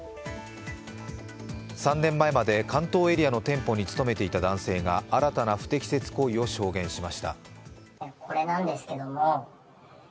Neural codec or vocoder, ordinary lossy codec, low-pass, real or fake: none; none; none; real